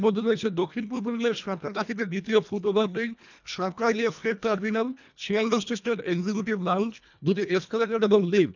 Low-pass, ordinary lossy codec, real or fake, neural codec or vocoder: 7.2 kHz; none; fake; codec, 24 kHz, 1.5 kbps, HILCodec